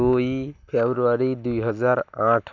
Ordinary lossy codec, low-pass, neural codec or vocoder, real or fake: none; 7.2 kHz; none; real